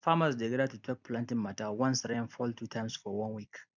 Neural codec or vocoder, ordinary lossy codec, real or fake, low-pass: vocoder, 44.1 kHz, 128 mel bands every 256 samples, BigVGAN v2; none; fake; 7.2 kHz